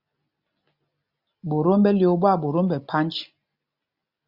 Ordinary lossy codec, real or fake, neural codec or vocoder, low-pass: Opus, 64 kbps; real; none; 5.4 kHz